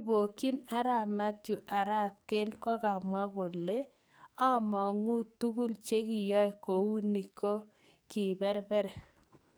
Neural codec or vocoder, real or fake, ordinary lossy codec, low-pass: codec, 44.1 kHz, 2.6 kbps, SNAC; fake; none; none